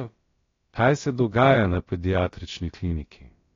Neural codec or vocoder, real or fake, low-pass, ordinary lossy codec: codec, 16 kHz, about 1 kbps, DyCAST, with the encoder's durations; fake; 7.2 kHz; AAC, 32 kbps